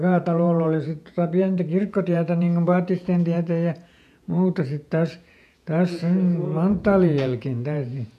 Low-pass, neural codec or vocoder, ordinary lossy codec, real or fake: 14.4 kHz; vocoder, 48 kHz, 128 mel bands, Vocos; none; fake